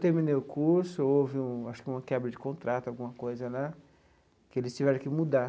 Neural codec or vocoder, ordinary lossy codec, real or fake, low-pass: none; none; real; none